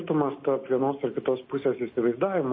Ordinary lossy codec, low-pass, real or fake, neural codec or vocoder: MP3, 24 kbps; 7.2 kHz; real; none